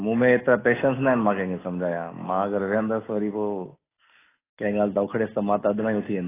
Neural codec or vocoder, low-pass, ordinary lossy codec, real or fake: none; 3.6 kHz; AAC, 16 kbps; real